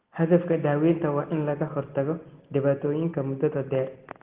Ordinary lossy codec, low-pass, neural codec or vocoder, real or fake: Opus, 16 kbps; 3.6 kHz; none; real